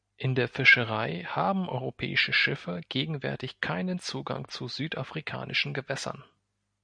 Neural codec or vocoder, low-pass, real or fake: none; 9.9 kHz; real